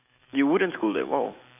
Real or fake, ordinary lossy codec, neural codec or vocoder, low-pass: fake; none; autoencoder, 48 kHz, 128 numbers a frame, DAC-VAE, trained on Japanese speech; 3.6 kHz